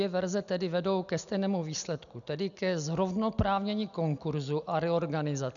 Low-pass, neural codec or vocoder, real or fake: 7.2 kHz; none; real